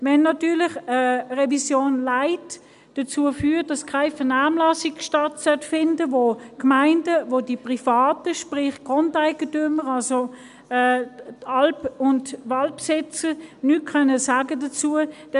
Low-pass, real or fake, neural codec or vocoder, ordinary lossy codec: 10.8 kHz; real; none; none